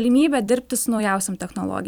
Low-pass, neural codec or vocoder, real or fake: 19.8 kHz; vocoder, 44.1 kHz, 128 mel bands every 256 samples, BigVGAN v2; fake